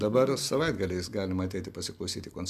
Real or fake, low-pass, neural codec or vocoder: fake; 14.4 kHz; vocoder, 44.1 kHz, 128 mel bands every 256 samples, BigVGAN v2